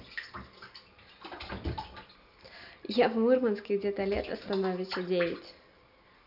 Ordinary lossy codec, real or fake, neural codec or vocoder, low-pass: none; real; none; 5.4 kHz